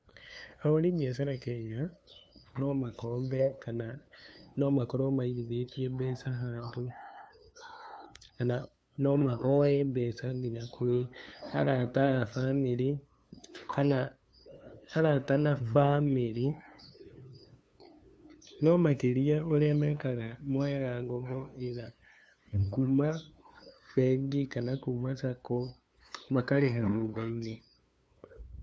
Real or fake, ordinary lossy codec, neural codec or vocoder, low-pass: fake; none; codec, 16 kHz, 2 kbps, FunCodec, trained on LibriTTS, 25 frames a second; none